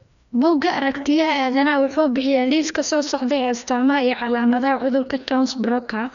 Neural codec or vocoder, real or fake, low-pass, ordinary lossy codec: codec, 16 kHz, 1 kbps, FreqCodec, larger model; fake; 7.2 kHz; MP3, 96 kbps